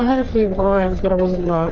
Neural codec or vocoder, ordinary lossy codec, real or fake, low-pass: codec, 44.1 kHz, 3.4 kbps, Pupu-Codec; Opus, 24 kbps; fake; 7.2 kHz